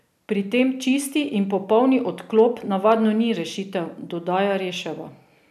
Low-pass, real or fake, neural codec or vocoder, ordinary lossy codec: 14.4 kHz; real; none; none